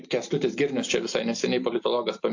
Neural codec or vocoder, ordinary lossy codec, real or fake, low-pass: none; AAC, 48 kbps; real; 7.2 kHz